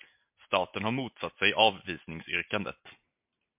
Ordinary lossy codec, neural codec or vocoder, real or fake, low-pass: MP3, 32 kbps; none; real; 3.6 kHz